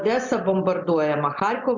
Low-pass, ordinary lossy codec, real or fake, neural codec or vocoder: 7.2 kHz; MP3, 64 kbps; real; none